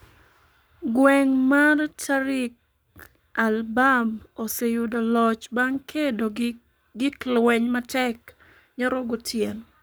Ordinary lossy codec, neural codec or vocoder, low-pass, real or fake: none; codec, 44.1 kHz, 7.8 kbps, Pupu-Codec; none; fake